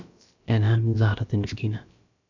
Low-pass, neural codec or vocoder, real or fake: 7.2 kHz; codec, 16 kHz, about 1 kbps, DyCAST, with the encoder's durations; fake